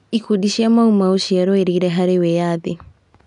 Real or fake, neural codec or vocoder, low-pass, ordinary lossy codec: real; none; 10.8 kHz; none